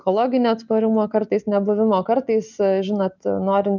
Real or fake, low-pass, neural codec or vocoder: real; 7.2 kHz; none